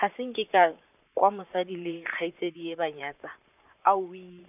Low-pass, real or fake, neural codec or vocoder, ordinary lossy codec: 3.6 kHz; fake; vocoder, 44.1 kHz, 128 mel bands, Pupu-Vocoder; none